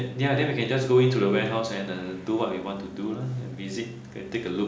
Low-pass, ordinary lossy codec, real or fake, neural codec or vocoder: none; none; real; none